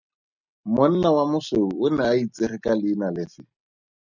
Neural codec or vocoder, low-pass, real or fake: none; 7.2 kHz; real